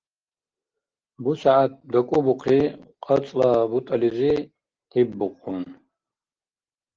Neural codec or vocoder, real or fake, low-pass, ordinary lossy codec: none; real; 7.2 kHz; Opus, 16 kbps